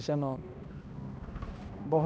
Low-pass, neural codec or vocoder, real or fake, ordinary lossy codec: none; codec, 16 kHz, 1 kbps, X-Codec, HuBERT features, trained on balanced general audio; fake; none